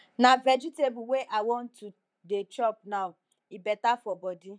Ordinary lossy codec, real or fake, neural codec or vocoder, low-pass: none; fake; vocoder, 44.1 kHz, 128 mel bands, Pupu-Vocoder; 9.9 kHz